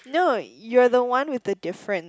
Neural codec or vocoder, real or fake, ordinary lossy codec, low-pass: none; real; none; none